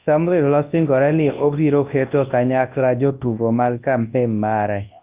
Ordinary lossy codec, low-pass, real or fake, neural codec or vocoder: Opus, 24 kbps; 3.6 kHz; fake; codec, 24 kHz, 0.9 kbps, WavTokenizer, large speech release